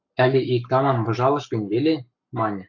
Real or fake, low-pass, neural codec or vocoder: fake; 7.2 kHz; codec, 44.1 kHz, 7.8 kbps, Pupu-Codec